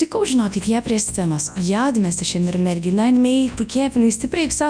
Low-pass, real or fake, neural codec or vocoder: 9.9 kHz; fake; codec, 24 kHz, 0.9 kbps, WavTokenizer, large speech release